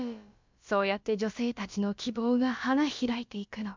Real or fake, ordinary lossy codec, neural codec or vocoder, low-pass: fake; MP3, 64 kbps; codec, 16 kHz, about 1 kbps, DyCAST, with the encoder's durations; 7.2 kHz